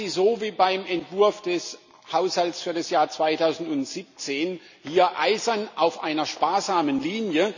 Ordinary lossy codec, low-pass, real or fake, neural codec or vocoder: none; 7.2 kHz; real; none